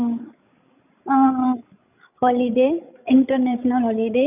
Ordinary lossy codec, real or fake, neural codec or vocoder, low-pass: none; fake; codec, 16 kHz, 16 kbps, FreqCodec, larger model; 3.6 kHz